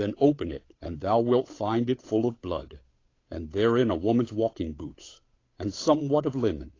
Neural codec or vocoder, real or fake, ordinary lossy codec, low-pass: codec, 16 kHz, 8 kbps, FunCodec, trained on Chinese and English, 25 frames a second; fake; AAC, 32 kbps; 7.2 kHz